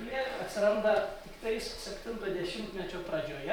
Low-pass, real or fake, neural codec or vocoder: 19.8 kHz; fake; vocoder, 44.1 kHz, 128 mel bands every 256 samples, BigVGAN v2